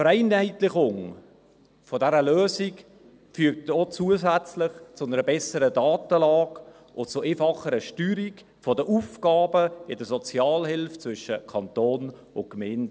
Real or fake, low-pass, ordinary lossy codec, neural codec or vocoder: real; none; none; none